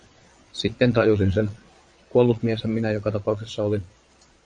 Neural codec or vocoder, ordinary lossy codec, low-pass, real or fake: vocoder, 22.05 kHz, 80 mel bands, Vocos; AAC, 64 kbps; 9.9 kHz; fake